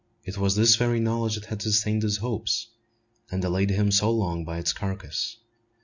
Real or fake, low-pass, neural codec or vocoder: real; 7.2 kHz; none